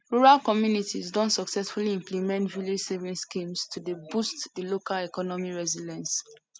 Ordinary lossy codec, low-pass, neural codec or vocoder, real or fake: none; none; none; real